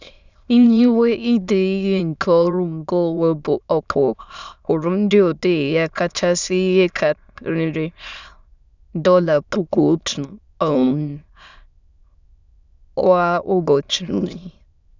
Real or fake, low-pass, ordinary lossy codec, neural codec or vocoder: fake; 7.2 kHz; none; autoencoder, 22.05 kHz, a latent of 192 numbers a frame, VITS, trained on many speakers